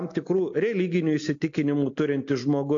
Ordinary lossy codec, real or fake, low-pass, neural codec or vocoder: AAC, 48 kbps; real; 7.2 kHz; none